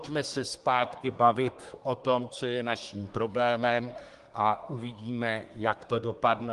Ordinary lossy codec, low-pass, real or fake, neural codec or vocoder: Opus, 24 kbps; 10.8 kHz; fake; codec, 24 kHz, 1 kbps, SNAC